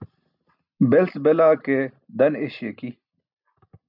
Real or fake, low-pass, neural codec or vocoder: real; 5.4 kHz; none